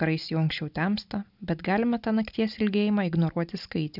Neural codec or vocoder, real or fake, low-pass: none; real; 5.4 kHz